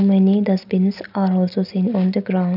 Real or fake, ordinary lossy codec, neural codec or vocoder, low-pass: real; none; none; 5.4 kHz